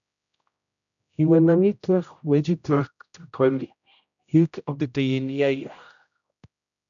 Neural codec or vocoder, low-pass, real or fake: codec, 16 kHz, 0.5 kbps, X-Codec, HuBERT features, trained on general audio; 7.2 kHz; fake